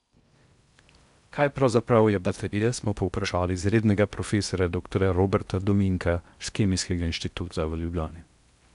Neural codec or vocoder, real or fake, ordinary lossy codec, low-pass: codec, 16 kHz in and 24 kHz out, 0.6 kbps, FocalCodec, streaming, 4096 codes; fake; none; 10.8 kHz